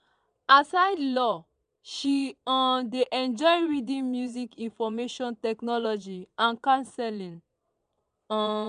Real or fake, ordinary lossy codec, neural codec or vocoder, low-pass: fake; none; vocoder, 22.05 kHz, 80 mel bands, Vocos; 9.9 kHz